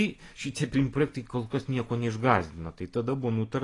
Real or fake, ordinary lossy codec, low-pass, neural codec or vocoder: real; AAC, 32 kbps; 10.8 kHz; none